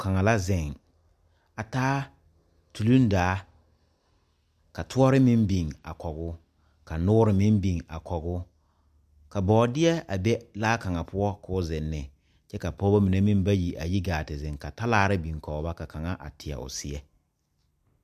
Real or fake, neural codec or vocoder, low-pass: real; none; 14.4 kHz